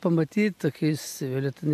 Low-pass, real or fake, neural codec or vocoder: 14.4 kHz; real; none